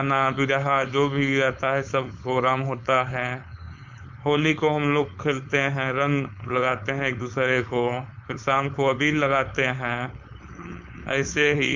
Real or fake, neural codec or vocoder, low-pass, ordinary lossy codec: fake; codec, 16 kHz, 4.8 kbps, FACodec; 7.2 kHz; MP3, 64 kbps